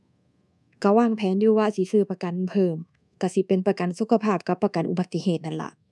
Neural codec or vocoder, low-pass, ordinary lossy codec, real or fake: codec, 24 kHz, 1.2 kbps, DualCodec; none; none; fake